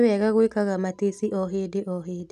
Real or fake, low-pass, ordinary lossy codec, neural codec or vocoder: real; 14.4 kHz; none; none